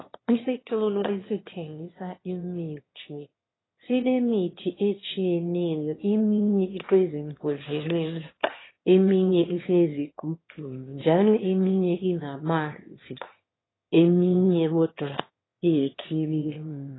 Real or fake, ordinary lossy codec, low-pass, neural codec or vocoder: fake; AAC, 16 kbps; 7.2 kHz; autoencoder, 22.05 kHz, a latent of 192 numbers a frame, VITS, trained on one speaker